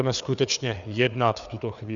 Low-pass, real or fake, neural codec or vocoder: 7.2 kHz; fake; codec, 16 kHz, 4 kbps, FreqCodec, larger model